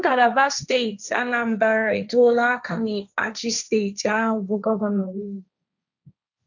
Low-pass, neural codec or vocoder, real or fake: 7.2 kHz; codec, 16 kHz, 1.1 kbps, Voila-Tokenizer; fake